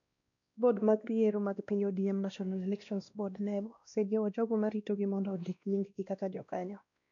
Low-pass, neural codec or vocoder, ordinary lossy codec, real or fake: 7.2 kHz; codec, 16 kHz, 1 kbps, X-Codec, WavLM features, trained on Multilingual LibriSpeech; none; fake